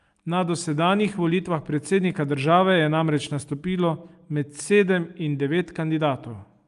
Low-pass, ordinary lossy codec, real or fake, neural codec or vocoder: 10.8 kHz; Opus, 32 kbps; real; none